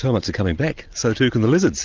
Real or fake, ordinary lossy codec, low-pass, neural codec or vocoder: real; Opus, 32 kbps; 7.2 kHz; none